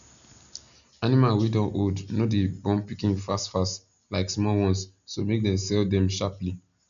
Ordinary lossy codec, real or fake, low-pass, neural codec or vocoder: none; real; 7.2 kHz; none